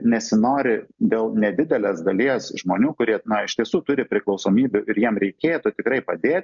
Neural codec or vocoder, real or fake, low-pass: none; real; 7.2 kHz